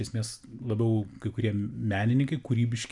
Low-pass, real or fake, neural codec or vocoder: 10.8 kHz; real; none